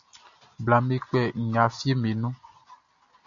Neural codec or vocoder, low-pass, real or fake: none; 7.2 kHz; real